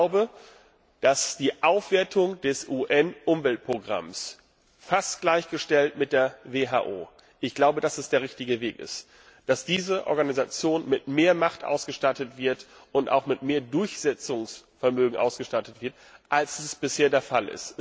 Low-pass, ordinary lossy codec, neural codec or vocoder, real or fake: none; none; none; real